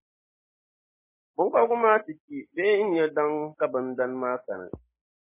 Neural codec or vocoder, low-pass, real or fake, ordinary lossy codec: none; 3.6 kHz; real; MP3, 16 kbps